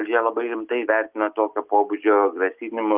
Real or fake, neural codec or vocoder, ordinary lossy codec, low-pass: real; none; Opus, 32 kbps; 3.6 kHz